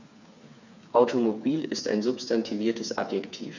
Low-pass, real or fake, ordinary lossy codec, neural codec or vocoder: 7.2 kHz; fake; none; codec, 16 kHz, 4 kbps, FreqCodec, smaller model